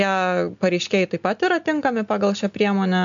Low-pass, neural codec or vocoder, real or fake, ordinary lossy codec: 7.2 kHz; none; real; MP3, 64 kbps